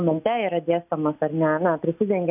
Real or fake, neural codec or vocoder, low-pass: real; none; 3.6 kHz